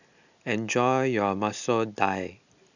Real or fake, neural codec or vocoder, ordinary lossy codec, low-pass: real; none; none; 7.2 kHz